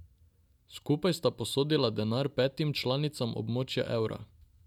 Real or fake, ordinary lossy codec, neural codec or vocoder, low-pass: real; none; none; 19.8 kHz